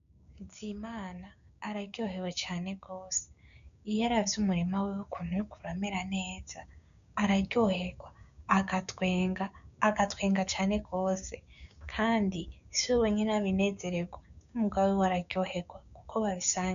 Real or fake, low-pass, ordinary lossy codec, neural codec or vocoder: fake; 7.2 kHz; MP3, 96 kbps; codec, 16 kHz, 6 kbps, DAC